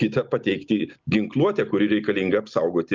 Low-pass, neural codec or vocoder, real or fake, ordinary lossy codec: 7.2 kHz; none; real; Opus, 24 kbps